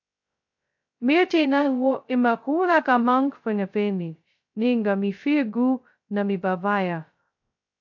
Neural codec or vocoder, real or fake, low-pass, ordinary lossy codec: codec, 16 kHz, 0.2 kbps, FocalCodec; fake; 7.2 kHz; AAC, 48 kbps